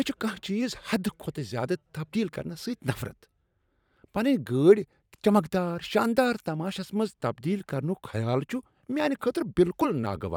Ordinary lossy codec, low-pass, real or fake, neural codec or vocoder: none; 19.8 kHz; fake; vocoder, 44.1 kHz, 128 mel bands every 512 samples, BigVGAN v2